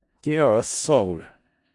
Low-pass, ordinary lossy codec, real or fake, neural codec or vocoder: 10.8 kHz; Opus, 64 kbps; fake; codec, 16 kHz in and 24 kHz out, 0.4 kbps, LongCat-Audio-Codec, four codebook decoder